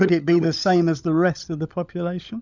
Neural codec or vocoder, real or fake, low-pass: codec, 16 kHz, 16 kbps, FunCodec, trained on LibriTTS, 50 frames a second; fake; 7.2 kHz